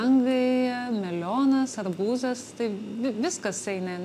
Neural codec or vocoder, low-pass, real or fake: none; 14.4 kHz; real